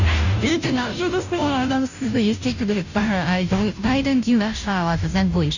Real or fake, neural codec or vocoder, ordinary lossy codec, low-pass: fake; codec, 16 kHz, 0.5 kbps, FunCodec, trained on Chinese and English, 25 frames a second; none; 7.2 kHz